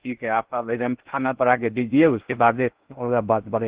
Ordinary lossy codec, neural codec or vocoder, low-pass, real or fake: Opus, 16 kbps; codec, 16 kHz in and 24 kHz out, 0.6 kbps, FocalCodec, streaming, 4096 codes; 3.6 kHz; fake